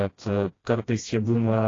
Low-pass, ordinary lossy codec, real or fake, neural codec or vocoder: 7.2 kHz; AAC, 32 kbps; fake; codec, 16 kHz, 1 kbps, FreqCodec, smaller model